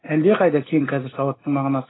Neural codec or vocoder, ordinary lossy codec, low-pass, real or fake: none; AAC, 16 kbps; 7.2 kHz; real